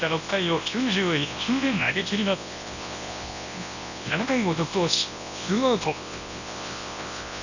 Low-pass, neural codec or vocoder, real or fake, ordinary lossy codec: 7.2 kHz; codec, 24 kHz, 0.9 kbps, WavTokenizer, large speech release; fake; none